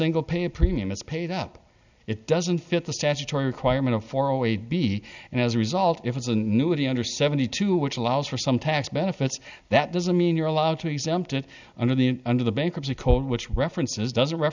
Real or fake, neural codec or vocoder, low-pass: real; none; 7.2 kHz